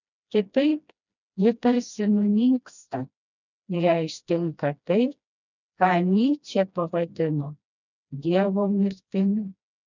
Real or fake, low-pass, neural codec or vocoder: fake; 7.2 kHz; codec, 16 kHz, 1 kbps, FreqCodec, smaller model